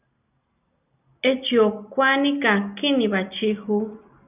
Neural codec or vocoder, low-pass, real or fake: none; 3.6 kHz; real